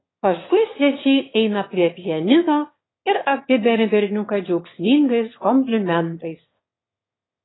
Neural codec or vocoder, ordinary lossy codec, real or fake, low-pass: autoencoder, 22.05 kHz, a latent of 192 numbers a frame, VITS, trained on one speaker; AAC, 16 kbps; fake; 7.2 kHz